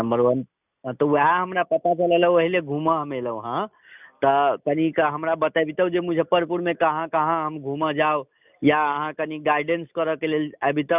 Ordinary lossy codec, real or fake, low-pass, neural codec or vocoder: none; real; 3.6 kHz; none